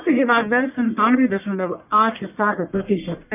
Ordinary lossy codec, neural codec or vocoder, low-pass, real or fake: none; codec, 44.1 kHz, 1.7 kbps, Pupu-Codec; 3.6 kHz; fake